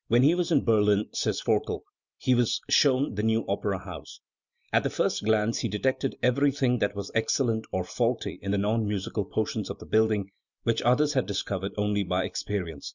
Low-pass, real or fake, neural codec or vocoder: 7.2 kHz; real; none